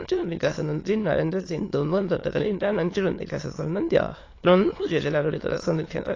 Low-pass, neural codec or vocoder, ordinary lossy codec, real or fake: 7.2 kHz; autoencoder, 22.05 kHz, a latent of 192 numbers a frame, VITS, trained on many speakers; AAC, 32 kbps; fake